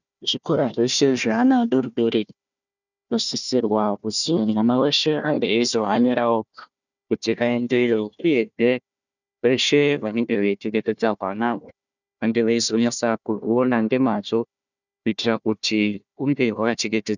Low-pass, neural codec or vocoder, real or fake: 7.2 kHz; codec, 16 kHz, 1 kbps, FunCodec, trained on Chinese and English, 50 frames a second; fake